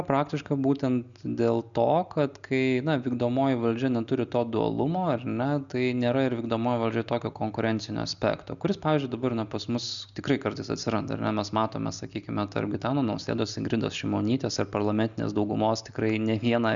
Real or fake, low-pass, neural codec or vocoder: real; 7.2 kHz; none